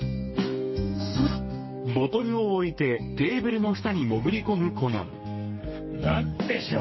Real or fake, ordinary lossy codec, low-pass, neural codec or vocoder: fake; MP3, 24 kbps; 7.2 kHz; codec, 32 kHz, 1.9 kbps, SNAC